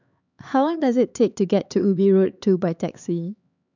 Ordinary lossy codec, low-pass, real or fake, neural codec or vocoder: none; 7.2 kHz; fake; codec, 16 kHz, 4 kbps, X-Codec, HuBERT features, trained on LibriSpeech